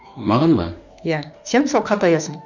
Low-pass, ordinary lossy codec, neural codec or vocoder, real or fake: 7.2 kHz; none; autoencoder, 48 kHz, 32 numbers a frame, DAC-VAE, trained on Japanese speech; fake